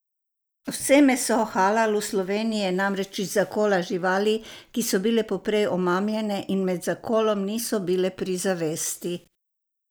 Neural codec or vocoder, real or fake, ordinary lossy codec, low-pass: none; real; none; none